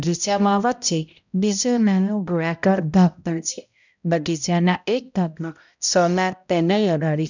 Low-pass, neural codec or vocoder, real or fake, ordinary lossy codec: 7.2 kHz; codec, 16 kHz, 0.5 kbps, X-Codec, HuBERT features, trained on balanced general audio; fake; none